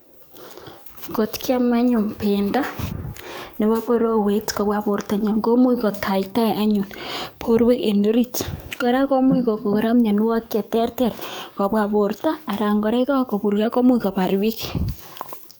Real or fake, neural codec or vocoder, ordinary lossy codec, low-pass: fake; codec, 44.1 kHz, 7.8 kbps, DAC; none; none